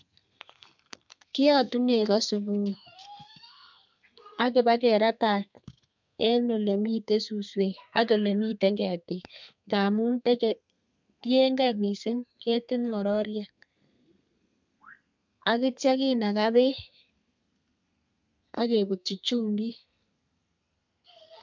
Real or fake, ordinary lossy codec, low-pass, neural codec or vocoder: fake; MP3, 64 kbps; 7.2 kHz; codec, 32 kHz, 1.9 kbps, SNAC